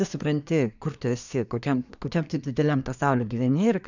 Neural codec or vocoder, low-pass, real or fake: codec, 24 kHz, 1 kbps, SNAC; 7.2 kHz; fake